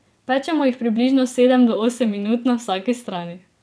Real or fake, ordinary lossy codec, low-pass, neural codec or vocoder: fake; none; none; vocoder, 22.05 kHz, 80 mel bands, WaveNeXt